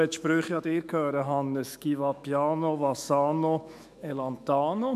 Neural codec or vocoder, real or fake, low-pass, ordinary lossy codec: autoencoder, 48 kHz, 128 numbers a frame, DAC-VAE, trained on Japanese speech; fake; 14.4 kHz; none